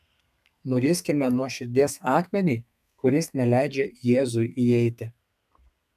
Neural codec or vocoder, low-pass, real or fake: codec, 32 kHz, 1.9 kbps, SNAC; 14.4 kHz; fake